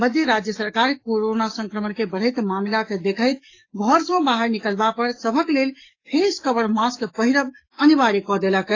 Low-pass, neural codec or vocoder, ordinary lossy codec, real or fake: 7.2 kHz; codec, 44.1 kHz, 7.8 kbps, DAC; AAC, 32 kbps; fake